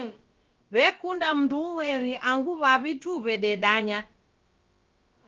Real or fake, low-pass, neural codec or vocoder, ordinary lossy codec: fake; 7.2 kHz; codec, 16 kHz, about 1 kbps, DyCAST, with the encoder's durations; Opus, 32 kbps